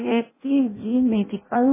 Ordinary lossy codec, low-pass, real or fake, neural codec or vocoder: MP3, 16 kbps; 3.6 kHz; fake; codec, 16 kHz in and 24 kHz out, 0.6 kbps, FireRedTTS-2 codec